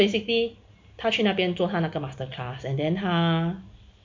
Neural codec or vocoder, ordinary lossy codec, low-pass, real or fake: none; MP3, 48 kbps; 7.2 kHz; real